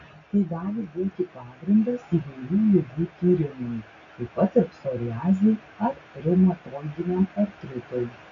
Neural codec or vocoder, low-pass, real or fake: none; 7.2 kHz; real